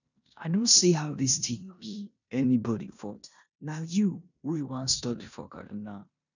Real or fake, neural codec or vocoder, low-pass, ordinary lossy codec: fake; codec, 16 kHz in and 24 kHz out, 0.9 kbps, LongCat-Audio-Codec, four codebook decoder; 7.2 kHz; none